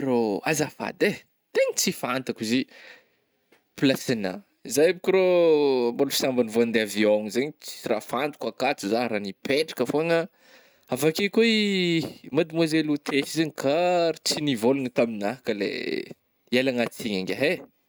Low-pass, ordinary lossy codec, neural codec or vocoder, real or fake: none; none; none; real